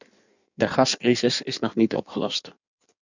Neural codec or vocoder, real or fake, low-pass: codec, 16 kHz in and 24 kHz out, 1.1 kbps, FireRedTTS-2 codec; fake; 7.2 kHz